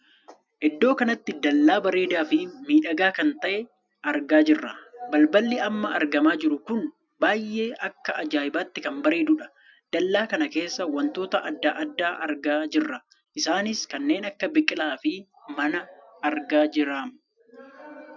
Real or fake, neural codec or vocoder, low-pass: real; none; 7.2 kHz